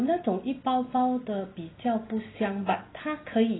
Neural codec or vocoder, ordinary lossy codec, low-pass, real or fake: none; AAC, 16 kbps; 7.2 kHz; real